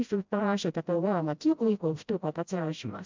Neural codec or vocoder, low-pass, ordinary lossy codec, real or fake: codec, 16 kHz, 0.5 kbps, FreqCodec, smaller model; 7.2 kHz; MP3, 64 kbps; fake